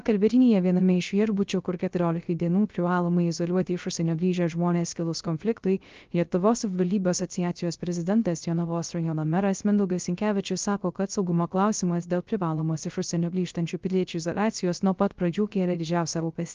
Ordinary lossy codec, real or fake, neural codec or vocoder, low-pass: Opus, 32 kbps; fake; codec, 16 kHz, 0.3 kbps, FocalCodec; 7.2 kHz